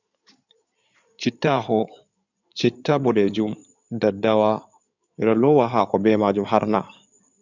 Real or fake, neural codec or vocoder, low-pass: fake; codec, 16 kHz in and 24 kHz out, 2.2 kbps, FireRedTTS-2 codec; 7.2 kHz